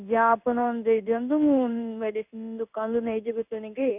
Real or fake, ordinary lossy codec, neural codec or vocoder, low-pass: fake; none; codec, 16 kHz in and 24 kHz out, 1 kbps, XY-Tokenizer; 3.6 kHz